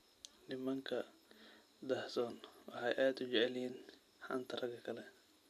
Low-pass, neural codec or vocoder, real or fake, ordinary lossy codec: 14.4 kHz; none; real; none